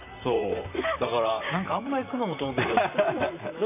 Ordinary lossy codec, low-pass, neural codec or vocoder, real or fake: none; 3.6 kHz; vocoder, 44.1 kHz, 128 mel bands, Pupu-Vocoder; fake